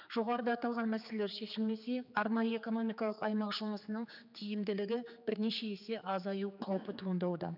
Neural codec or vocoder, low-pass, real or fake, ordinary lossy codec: codec, 16 kHz, 4 kbps, X-Codec, HuBERT features, trained on general audio; 5.4 kHz; fake; none